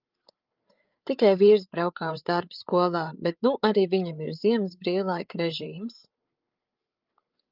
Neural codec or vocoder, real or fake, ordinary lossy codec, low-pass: codec, 16 kHz, 8 kbps, FreqCodec, larger model; fake; Opus, 24 kbps; 5.4 kHz